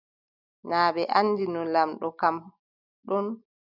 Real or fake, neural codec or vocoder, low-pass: real; none; 5.4 kHz